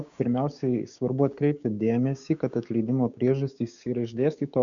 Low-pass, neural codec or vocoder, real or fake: 7.2 kHz; codec, 16 kHz, 6 kbps, DAC; fake